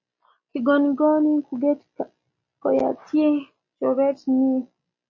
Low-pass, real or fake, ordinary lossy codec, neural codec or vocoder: 7.2 kHz; real; MP3, 32 kbps; none